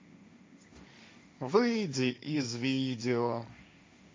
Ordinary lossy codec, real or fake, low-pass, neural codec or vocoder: none; fake; none; codec, 16 kHz, 1.1 kbps, Voila-Tokenizer